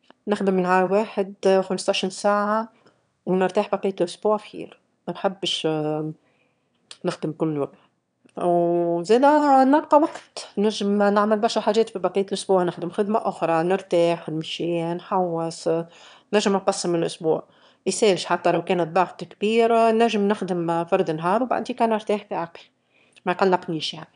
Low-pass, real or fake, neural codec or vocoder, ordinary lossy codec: 9.9 kHz; fake; autoencoder, 22.05 kHz, a latent of 192 numbers a frame, VITS, trained on one speaker; none